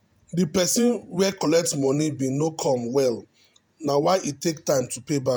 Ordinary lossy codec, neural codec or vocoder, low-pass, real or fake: none; vocoder, 48 kHz, 128 mel bands, Vocos; none; fake